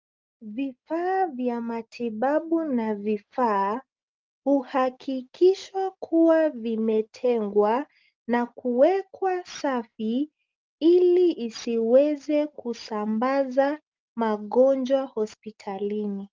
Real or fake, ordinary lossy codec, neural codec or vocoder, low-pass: real; Opus, 24 kbps; none; 7.2 kHz